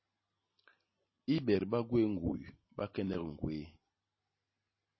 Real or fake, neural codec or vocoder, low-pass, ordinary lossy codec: real; none; 7.2 kHz; MP3, 24 kbps